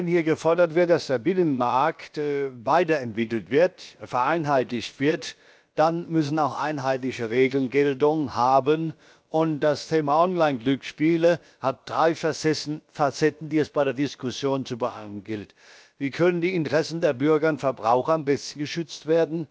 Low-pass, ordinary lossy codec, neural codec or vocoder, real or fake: none; none; codec, 16 kHz, about 1 kbps, DyCAST, with the encoder's durations; fake